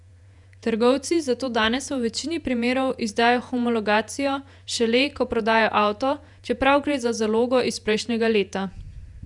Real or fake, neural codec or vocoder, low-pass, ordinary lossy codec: fake; vocoder, 48 kHz, 128 mel bands, Vocos; 10.8 kHz; none